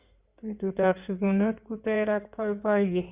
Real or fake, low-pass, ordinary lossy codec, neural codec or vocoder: fake; 3.6 kHz; Opus, 64 kbps; codec, 16 kHz in and 24 kHz out, 2.2 kbps, FireRedTTS-2 codec